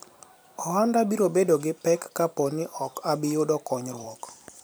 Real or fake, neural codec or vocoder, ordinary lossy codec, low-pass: fake; vocoder, 44.1 kHz, 128 mel bands every 256 samples, BigVGAN v2; none; none